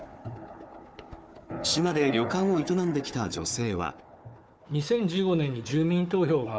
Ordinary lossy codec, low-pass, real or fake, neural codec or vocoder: none; none; fake; codec, 16 kHz, 4 kbps, FunCodec, trained on Chinese and English, 50 frames a second